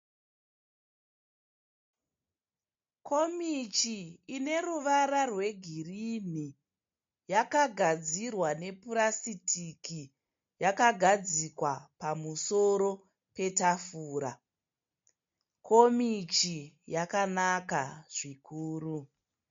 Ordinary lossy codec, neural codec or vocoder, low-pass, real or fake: MP3, 48 kbps; none; 7.2 kHz; real